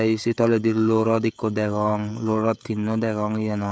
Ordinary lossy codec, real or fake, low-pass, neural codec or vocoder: none; fake; none; codec, 16 kHz, 16 kbps, FreqCodec, smaller model